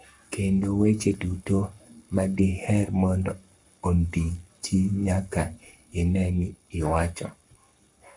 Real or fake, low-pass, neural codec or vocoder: fake; 10.8 kHz; codec, 44.1 kHz, 7.8 kbps, Pupu-Codec